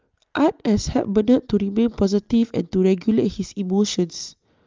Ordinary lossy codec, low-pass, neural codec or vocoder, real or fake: Opus, 24 kbps; 7.2 kHz; none; real